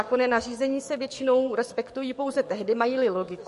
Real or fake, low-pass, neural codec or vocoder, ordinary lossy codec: fake; 14.4 kHz; codec, 44.1 kHz, 7.8 kbps, DAC; MP3, 48 kbps